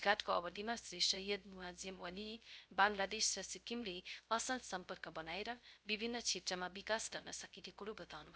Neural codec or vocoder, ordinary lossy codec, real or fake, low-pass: codec, 16 kHz, 0.3 kbps, FocalCodec; none; fake; none